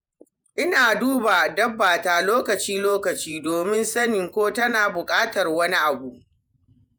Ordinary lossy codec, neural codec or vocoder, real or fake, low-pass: none; vocoder, 48 kHz, 128 mel bands, Vocos; fake; none